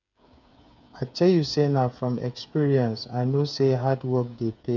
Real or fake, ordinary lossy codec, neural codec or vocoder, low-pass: fake; none; codec, 16 kHz, 8 kbps, FreqCodec, smaller model; 7.2 kHz